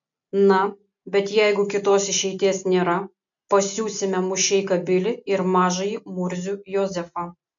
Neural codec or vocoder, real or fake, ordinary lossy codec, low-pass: none; real; MP3, 64 kbps; 7.2 kHz